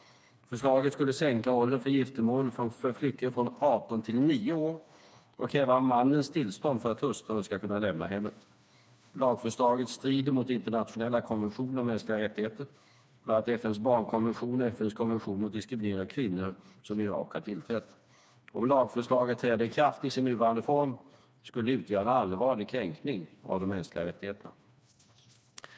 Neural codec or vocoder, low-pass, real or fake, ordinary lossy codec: codec, 16 kHz, 2 kbps, FreqCodec, smaller model; none; fake; none